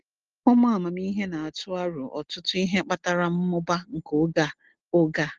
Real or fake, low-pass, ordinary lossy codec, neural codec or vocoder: real; 7.2 kHz; Opus, 16 kbps; none